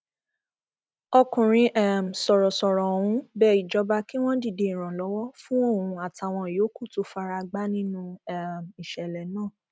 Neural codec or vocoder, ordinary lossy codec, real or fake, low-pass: none; none; real; none